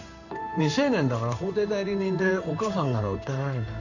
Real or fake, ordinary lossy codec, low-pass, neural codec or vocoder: fake; none; 7.2 kHz; codec, 16 kHz in and 24 kHz out, 1 kbps, XY-Tokenizer